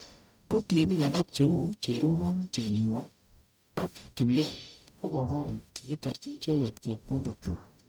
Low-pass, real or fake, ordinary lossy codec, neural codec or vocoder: none; fake; none; codec, 44.1 kHz, 0.9 kbps, DAC